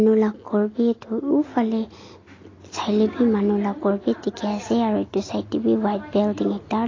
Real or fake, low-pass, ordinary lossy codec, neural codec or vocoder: real; 7.2 kHz; AAC, 32 kbps; none